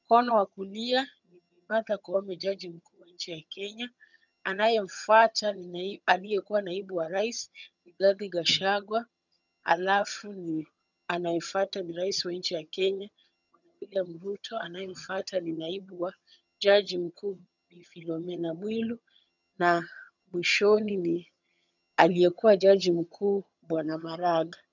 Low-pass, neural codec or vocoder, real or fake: 7.2 kHz; vocoder, 22.05 kHz, 80 mel bands, HiFi-GAN; fake